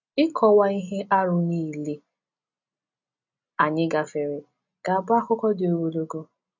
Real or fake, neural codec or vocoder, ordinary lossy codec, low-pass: real; none; none; 7.2 kHz